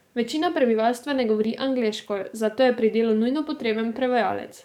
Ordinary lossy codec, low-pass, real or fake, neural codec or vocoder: none; 19.8 kHz; fake; codec, 44.1 kHz, 7.8 kbps, DAC